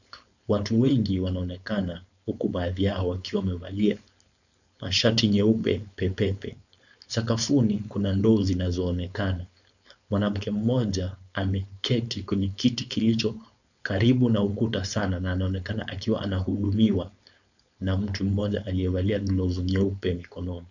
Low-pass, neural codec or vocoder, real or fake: 7.2 kHz; codec, 16 kHz, 4.8 kbps, FACodec; fake